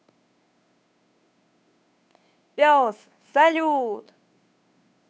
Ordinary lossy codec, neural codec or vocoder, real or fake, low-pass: none; codec, 16 kHz, 2 kbps, FunCodec, trained on Chinese and English, 25 frames a second; fake; none